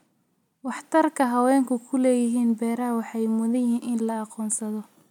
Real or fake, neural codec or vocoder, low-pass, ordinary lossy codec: real; none; 19.8 kHz; none